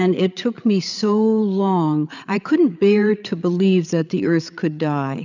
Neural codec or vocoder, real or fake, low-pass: codec, 16 kHz, 8 kbps, FreqCodec, larger model; fake; 7.2 kHz